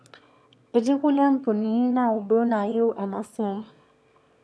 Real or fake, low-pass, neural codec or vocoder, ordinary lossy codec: fake; none; autoencoder, 22.05 kHz, a latent of 192 numbers a frame, VITS, trained on one speaker; none